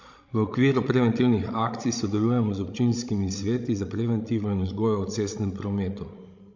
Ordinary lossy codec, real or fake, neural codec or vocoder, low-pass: MP3, 48 kbps; fake; codec, 16 kHz, 16 kbps, FreqCodec, larger model; 7.2 kHz